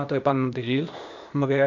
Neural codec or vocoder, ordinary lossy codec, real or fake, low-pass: codec, 16 kHz, 0.8 kbps, ZipCodec; none; fake; 7.2 kHz